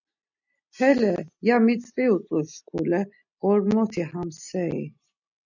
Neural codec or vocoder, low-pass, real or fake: none; 7.2 kHz; real